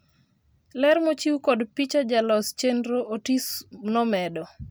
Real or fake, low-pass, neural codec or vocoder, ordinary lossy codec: real; none; none; none